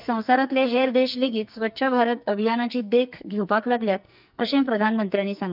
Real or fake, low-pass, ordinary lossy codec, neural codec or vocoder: fake; 5.4 kHz; none; codec, 44.1 kHz, 2.6 kbps, SNAC